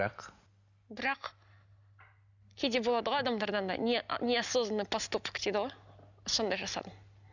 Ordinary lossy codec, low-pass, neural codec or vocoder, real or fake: none; 7.2 kHz; none; real